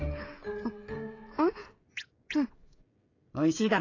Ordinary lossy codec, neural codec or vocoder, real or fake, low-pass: AAC, 32 kbps; codec, 16 kHz, 16 kbps, FreqCodec, smaller model; fake; 7.2 kHz